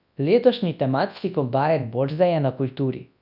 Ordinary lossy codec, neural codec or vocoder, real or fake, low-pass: none; codec, 24 kHz, 0.9 kbps, WavTokenizer, large speech release; fake; 5.4 kHz